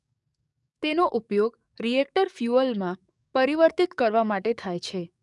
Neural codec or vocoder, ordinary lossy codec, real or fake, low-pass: codec, 44.1 kHz, 7.8 kbps, DAC; none; fake; 10.8 kHz